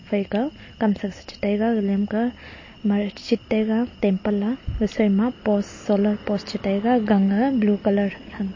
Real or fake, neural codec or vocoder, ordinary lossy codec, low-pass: real; none; MP3, 32 kbps; 7.2 kHz